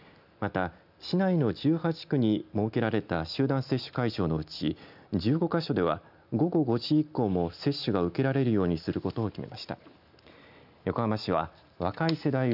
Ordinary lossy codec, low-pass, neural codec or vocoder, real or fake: none; 5.4 kHz; none; real